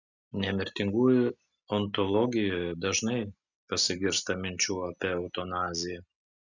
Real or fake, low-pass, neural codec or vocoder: real; 7.2 kHz; none